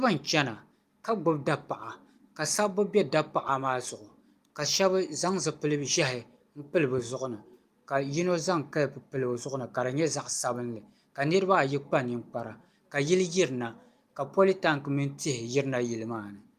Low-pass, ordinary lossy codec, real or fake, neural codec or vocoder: 14.4 kHz; Opus, 32 kbps; real; none